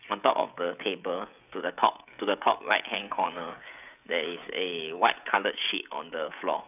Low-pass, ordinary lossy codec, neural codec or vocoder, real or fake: 3.6 kHz; none; codec, 16 kHz, 16 kbps, FreqCodec, smaller model; fake